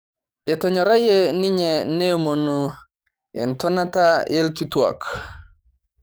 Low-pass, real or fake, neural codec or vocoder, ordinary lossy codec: none; fake; codec, 44.1 kHz, 7.8 kbps, DAC; none